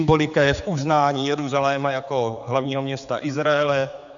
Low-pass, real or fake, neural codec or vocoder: 7.2 kHz; fake; codec, 16 kHz, 4 kbps, X-Codec, HuBERT features, trained on general audio